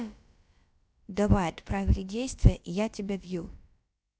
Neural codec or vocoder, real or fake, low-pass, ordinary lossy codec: codec, 16 kHz, about 1 kbps, DyCAST, with the encoder's durations; fake; none; none